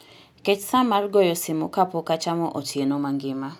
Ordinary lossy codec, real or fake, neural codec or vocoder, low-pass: none; real; none; none